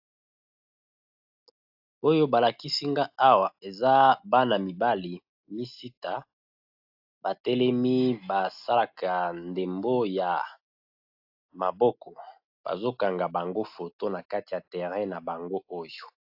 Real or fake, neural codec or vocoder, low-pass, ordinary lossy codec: real; none; 5.4 kHz; AAC, 48 kbps